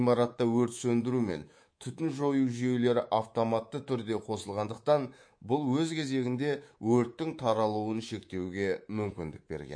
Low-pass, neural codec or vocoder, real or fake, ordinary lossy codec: 9.9 kHz; codec, 24 kHz, 3.1 kbps, DualCodec; fake; MP3, 48 kbps